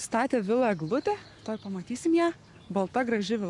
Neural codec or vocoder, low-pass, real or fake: codec, 44.1 kHz, 7.8 kbps, Pupu-Codec; 10.8 kHz; fake